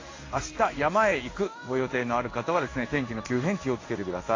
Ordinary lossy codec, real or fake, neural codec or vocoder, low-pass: AAC, 32 kbps; fake; codec, 16 kHz in and 24 kHz out, 1 kbps, XY-Tokenizer; 7.2 kHz